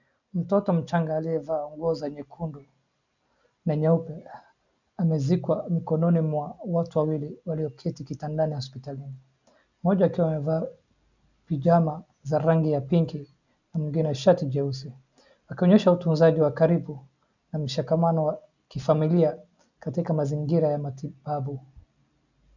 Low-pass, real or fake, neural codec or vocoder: 7.2 kHz; real; none